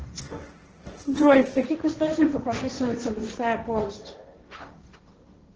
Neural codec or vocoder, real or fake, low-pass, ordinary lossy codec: codec, 16 kHz, 1.1 kbps, Voila-Tokenizer; fake; 7.2 kHz; Opus, 16 kbps